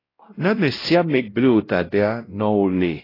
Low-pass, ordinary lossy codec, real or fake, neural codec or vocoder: 5.4 kHz; AAC, 24 kbps; fake; codec, 16 kHz, 0.5 kbps, X-Codec, WavLM features, trained on Multilingual LibriSpeech